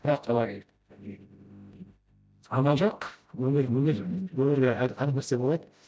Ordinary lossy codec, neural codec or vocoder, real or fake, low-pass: none; codec, 16 kHz, 0.5 kbps, FreqCodec, smaller model; fake; none